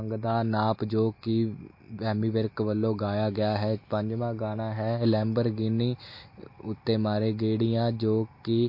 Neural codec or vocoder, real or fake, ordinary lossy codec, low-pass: none; real; MP3, 32 kbps; 5.4 kHz